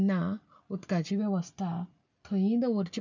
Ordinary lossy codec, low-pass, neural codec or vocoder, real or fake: none; 7.2 kHz; none; real